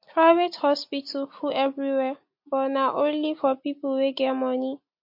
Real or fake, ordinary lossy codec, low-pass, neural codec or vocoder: real; MP3, 32 kbps; 5.4 kHz; none